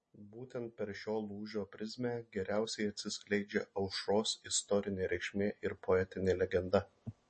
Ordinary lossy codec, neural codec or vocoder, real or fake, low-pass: MP3, 32 kbps; none; real; 10.8 kHz